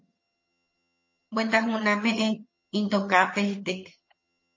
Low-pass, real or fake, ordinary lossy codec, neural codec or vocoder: 7.2 kHz; fake; MP3, 32 kbps; vocoder, 22.05 kHz, 80 mel bands, HiFi-GAN